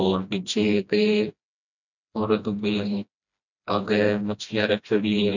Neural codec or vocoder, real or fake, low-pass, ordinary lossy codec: codec, 16 kHz, 1 kbps, FreqCodec, smaller model; fake; 7.2 kHz; none